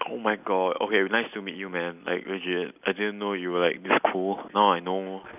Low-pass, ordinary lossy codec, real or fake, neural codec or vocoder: 3.6 kHz; none; real; none